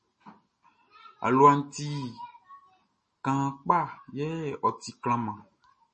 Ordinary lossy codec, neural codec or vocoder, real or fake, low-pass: MP3, 32 kbps; none; real; 10.8 kHz